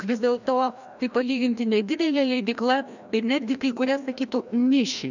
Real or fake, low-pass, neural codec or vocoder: fake; 7.2 kHz; codec, 16 kHz, 1 kbps, FreqCodec, larger model